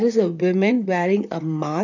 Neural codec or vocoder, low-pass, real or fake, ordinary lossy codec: vocoder, 44.1 kHz, 128 mel bands, Pupu-Vocoder; 7.2 kHz; fake; none